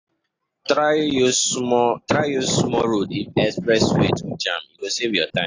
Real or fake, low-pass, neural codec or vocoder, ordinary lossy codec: real; 7.2 kHz; none; AAC, 32 kbps